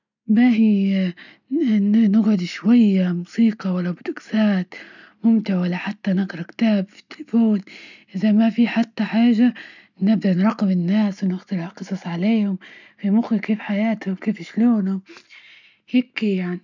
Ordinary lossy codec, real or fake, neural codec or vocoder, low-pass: none; real; none; 7.2 kHz